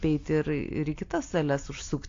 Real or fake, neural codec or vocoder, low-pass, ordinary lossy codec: real; none; 7.2 kHz; AAC, 48 kbps